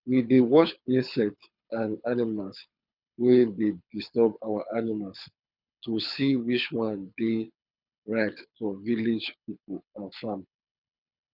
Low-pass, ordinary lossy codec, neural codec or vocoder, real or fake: 5.4 kHz; none; codec, 24 kHz, 6 kbps, HILCodec; fake